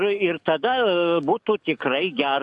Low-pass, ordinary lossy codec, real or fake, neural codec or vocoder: 10.8 kHz; AAC, 64 kbps; fake; codec, 44.1 kHz, 7.8 kbps, DAC